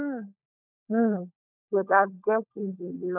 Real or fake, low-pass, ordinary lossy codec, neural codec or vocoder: fake; 3.6 kHz; none; codec, 16 kHz, 16 kbps, FunCodec, trained on LibriTTS, 50 frames a second